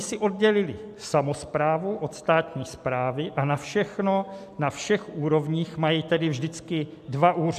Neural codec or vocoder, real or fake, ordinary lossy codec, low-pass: none; real; AAC, 96 kbps; 14.4 kHz